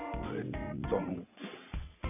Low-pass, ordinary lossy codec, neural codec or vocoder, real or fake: 3.6 kHz; none; vocoder, 22.05 kHz, 80 mel bands, WaveNeXt; fake